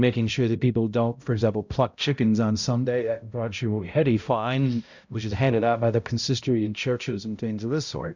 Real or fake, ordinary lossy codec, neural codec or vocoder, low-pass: fake; Opus, 64 kbps; codec, 16 kHz, 0.5 kbps, X-Codec, HuBERT features, trained on balanced general audio; 7.2 kHz